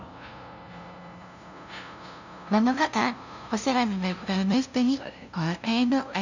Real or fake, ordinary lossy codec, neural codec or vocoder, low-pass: fake; none; codec, 16 kHz, 0.5 kbps, FunCodec, trained on LibriTTS, 25 frames a second; 7.2 kHz